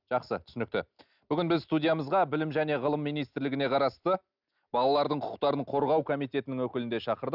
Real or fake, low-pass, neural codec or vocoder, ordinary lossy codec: real; 5.4 kHz; none; none